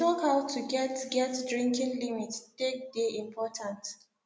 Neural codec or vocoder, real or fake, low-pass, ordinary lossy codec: none; real; none; none